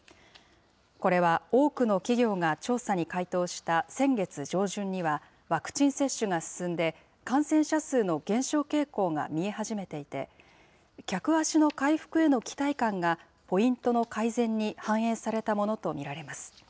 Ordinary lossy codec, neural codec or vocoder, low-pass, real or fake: none; none; none; real